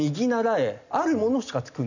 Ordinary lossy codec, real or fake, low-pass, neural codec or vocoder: none; real; 7.2 kHz; none